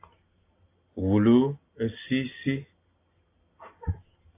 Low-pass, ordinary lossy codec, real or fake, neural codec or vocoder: 3.6 kHz; AAC, 24 kbps; real; none